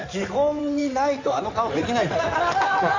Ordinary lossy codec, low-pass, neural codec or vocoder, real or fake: AAC, 48 kbps; 7.2 kHz; codec, 16 kHz in and 24 kHz out, 2.2 kbps, FireRedTTS-2 codec; fake